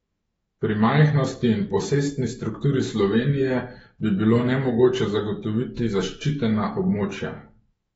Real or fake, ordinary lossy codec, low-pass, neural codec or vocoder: fake; AAC, 24 kbps; 19.8 kHz; autoencoder, 48 kHz, 128 numbers a frame, DAC-VAE, trained on Japanese speech